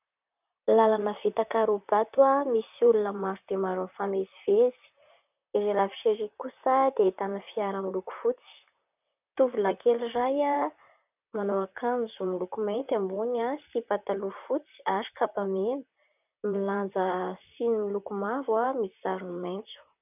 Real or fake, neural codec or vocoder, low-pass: fake; vocoder, 44.1 kHz, 128 mel bands, Pupu-Vocoder; 3.6 kHz